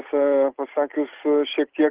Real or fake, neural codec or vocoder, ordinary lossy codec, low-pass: real; none; Opus, 16 kbps; 3.6 kHz